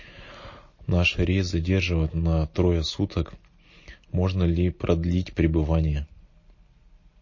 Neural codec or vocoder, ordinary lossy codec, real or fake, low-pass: none; MP3, 32 kbps; real; 7.2 kHz